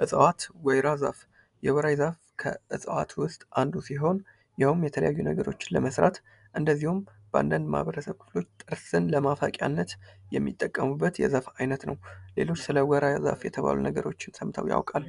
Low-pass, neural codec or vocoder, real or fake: 10.8 kHz; none; real